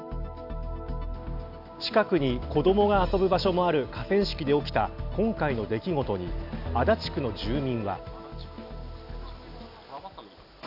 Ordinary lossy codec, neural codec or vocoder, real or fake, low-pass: none; none; real; 5.4 kHz